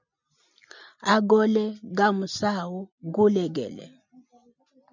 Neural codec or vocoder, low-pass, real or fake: none; 7.2 kHz; real